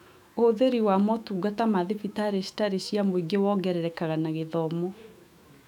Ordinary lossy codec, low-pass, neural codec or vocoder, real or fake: none; 19.8 kHz; autoencoder, 48 kHz, 128 numbers a frame, DAC-VAE, trained on Japanese speech; fake